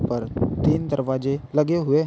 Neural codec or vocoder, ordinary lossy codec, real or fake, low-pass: none; none; real; none